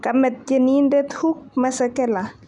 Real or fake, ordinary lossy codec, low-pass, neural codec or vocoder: real; none; 10.8 kHz; none